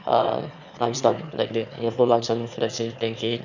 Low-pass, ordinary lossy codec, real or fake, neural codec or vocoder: 7.2 kHz; none; fake; autoencoder, 22.05 kHz, a latent of 192 numbers a frame, VITS, trained on one speaker